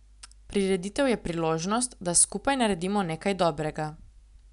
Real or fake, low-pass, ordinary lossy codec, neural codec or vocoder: real; 10.8 kHz; none; none